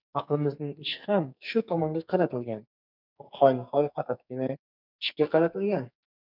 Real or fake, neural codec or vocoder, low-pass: fake; codec, 44.1 kHz, 2.6 kbps, SNAC; 5.4 kHz